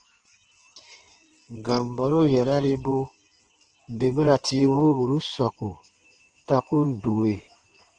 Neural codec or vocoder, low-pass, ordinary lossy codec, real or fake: codec, 16 kHz in and 24 kHz out, 1.1 kbps, FireRedTTS-2 codec; 9.9 kHz; Opus, 16 kbps; fake